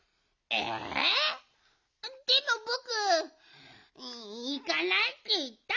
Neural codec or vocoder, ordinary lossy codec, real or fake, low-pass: none; none; real; 7.2 kHz